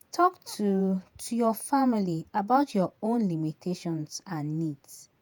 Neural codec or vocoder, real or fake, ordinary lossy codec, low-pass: vocoder, 48 kHz, 128 mel bands, Vocos; fake; none; none